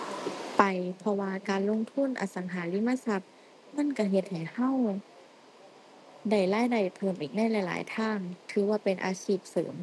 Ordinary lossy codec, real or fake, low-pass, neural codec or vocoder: none; real; none; none